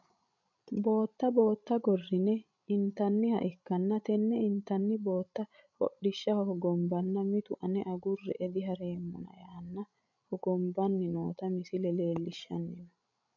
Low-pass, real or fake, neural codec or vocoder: 7.2 kHz; fake; codec, 16 kHz, 16 kbps, FreqCodec, larger model